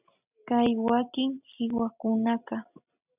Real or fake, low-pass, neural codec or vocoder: real; 3.6 kHz; none